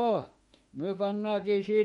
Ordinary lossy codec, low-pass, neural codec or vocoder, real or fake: MP3, 48 kbps; 19.8 kHz; autoencoder, 48 kHz, 32 numbers a frame, DAC-VAE, trained on Japanese speech; fake